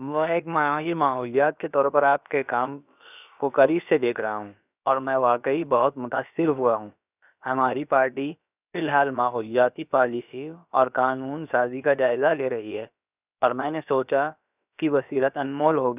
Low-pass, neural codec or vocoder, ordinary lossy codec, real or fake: 3.6 kHz; codec, 16 kHz, about 1 kbps, DyCAST, with the encoder's durations; none; fake